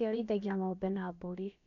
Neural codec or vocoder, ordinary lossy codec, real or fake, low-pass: codec, 16 kHz, about 1 kbps, DyCAST, with the encoder's durations; none; fake; 7.2 kHz